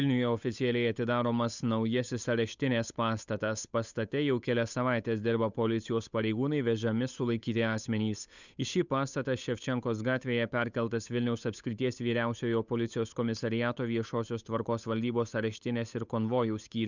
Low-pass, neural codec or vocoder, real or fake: 7.2 kHz; codec, 16 kHz, 8 kbps, FunCodec, trained on Chinese and English, 25 frames a second; fake